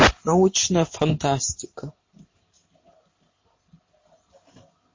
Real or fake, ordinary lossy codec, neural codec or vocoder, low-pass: real; MP3, 32 kbps; none; 7.2 kHz